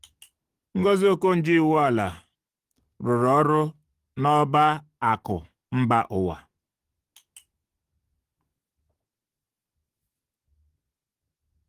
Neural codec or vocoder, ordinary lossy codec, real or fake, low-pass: codec, 44.1 kHz, 7.8 kbps, DAC; Opus, 24 kbps; fake; 14.4 kHz